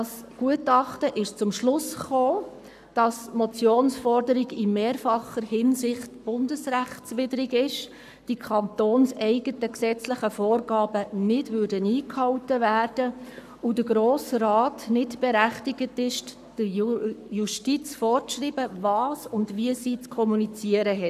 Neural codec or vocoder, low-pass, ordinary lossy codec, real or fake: codec, 44.1 kHz, 7.8 kbps, Pupu-Codec; 14.4 kHz; none; fake